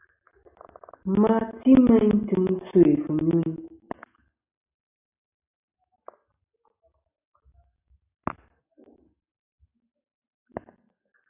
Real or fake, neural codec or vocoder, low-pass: real; none; 3.6 kHz